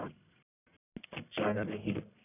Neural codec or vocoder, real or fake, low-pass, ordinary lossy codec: codec, 44.1 kHz, 1.7 kbps, Pupu-Codec; fake; 3.6 kHz; none